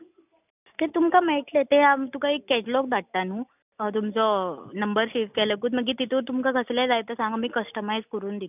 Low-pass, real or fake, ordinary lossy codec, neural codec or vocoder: 3.6 kHz; real; none; none